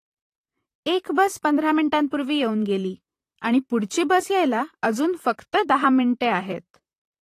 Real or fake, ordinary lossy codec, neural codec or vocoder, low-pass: fake; AAC, 48 kbps; vocoder, 44.1 kHz, 128 mel bands, Pupu-Vocoder; 14.4 kHz